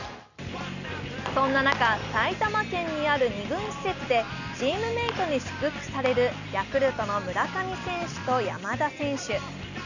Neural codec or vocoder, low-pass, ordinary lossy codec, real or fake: none; 7.2 kHz; none; real